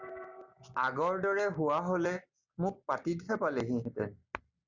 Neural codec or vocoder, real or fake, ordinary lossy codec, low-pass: none; real; Opus, 64 kbps; 7.2 kHz